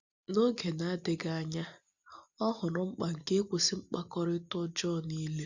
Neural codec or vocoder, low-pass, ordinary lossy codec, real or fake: none; 7.2 kHz; none; real